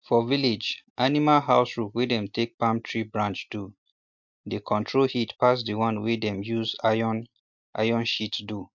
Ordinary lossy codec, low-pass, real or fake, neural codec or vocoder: MP3, 64 kbps; 7.2 kHz; real; none